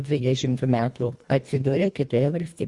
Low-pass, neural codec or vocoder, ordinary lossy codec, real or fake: 10.8 kHz; codec, 24 kHz, 1.5 kbps, HILCodec; Opus, 64 kbps; fake